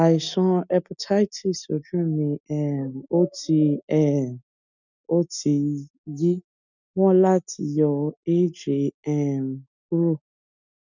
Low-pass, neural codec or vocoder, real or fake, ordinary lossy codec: 7.2 kHz; none; real; none